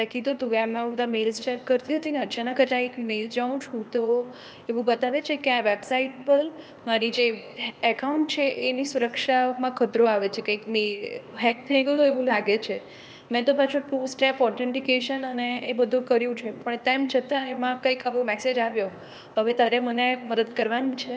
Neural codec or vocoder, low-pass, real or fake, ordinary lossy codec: codec, 16 kHz, 0.8 kbps, ZipCodec; none; fake; none